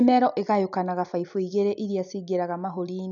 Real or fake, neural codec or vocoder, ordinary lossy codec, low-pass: real; none; none; 7.2 kHz